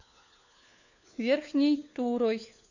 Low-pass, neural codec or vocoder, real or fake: 7.2 kHz; codec, 16 kHz, 4 kbps, FunCodec, trained on LibriTTS, 50 frames a second; fake